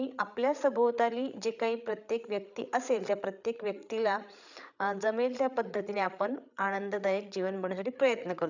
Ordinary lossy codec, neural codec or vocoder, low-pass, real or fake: none; codec, 16 kHz, 8 kbps, FreqCodec, larger model; 7.2 kHz; fake